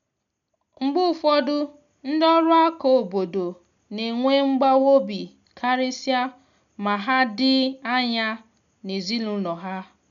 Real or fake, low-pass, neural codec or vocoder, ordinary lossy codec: real; 7.2 kHz; none; none